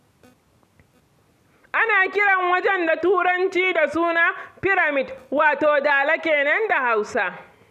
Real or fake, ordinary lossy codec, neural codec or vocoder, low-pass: real; none; none; 14.4 kHz